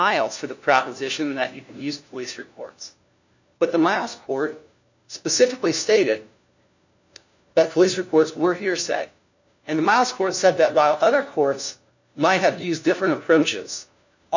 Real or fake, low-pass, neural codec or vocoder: fake; 7.2 kHz; codec, 16 kHz, 1 kbps, FunCodec, trained on LibriTTS, 50 frames a second